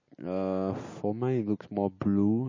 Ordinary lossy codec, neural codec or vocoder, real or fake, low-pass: MP3, 32 kbps; none; real; 7.2 kHz